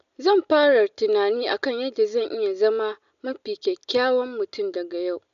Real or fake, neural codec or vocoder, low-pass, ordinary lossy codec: real; none; 7.2 kHz; none